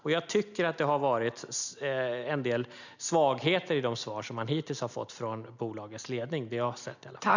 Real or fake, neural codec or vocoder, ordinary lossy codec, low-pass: real; none; none; 7.2 kHz